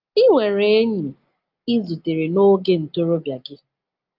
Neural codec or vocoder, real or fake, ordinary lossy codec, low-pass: none; real; Opus, 32 kbps; 5.4 kHz